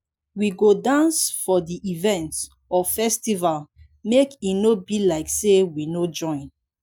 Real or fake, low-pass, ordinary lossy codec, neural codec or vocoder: real; none; none; none